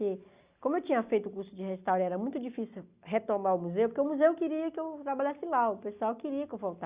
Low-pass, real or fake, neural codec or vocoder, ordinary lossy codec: 3.6 kHz; real; none; Opus, 64 kbps